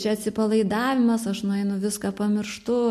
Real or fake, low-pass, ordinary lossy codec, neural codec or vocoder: real; 14.4 kHz; MP3, 64 kbps; none